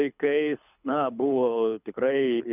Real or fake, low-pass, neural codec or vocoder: fake; 3.6 kHz; codec, 24 kHz, 6 kbps, HILCodec